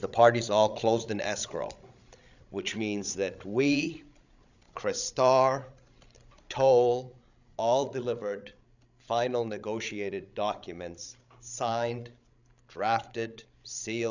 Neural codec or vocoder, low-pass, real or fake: codec, 16 kHz, 16 kbps, FreqCodec, larger model; 7.2 kHz; fake